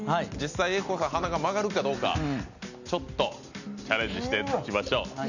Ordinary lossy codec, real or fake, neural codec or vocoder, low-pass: none; real; none; 7.2 kHz